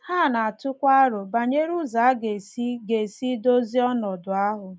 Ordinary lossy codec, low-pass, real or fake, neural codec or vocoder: none; none; real; none